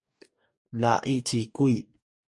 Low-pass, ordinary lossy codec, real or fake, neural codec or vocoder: 10.8 kHz; MP3, 48 kbps; fake; codec, 44.1 kHz, 2.6 kbps, DAC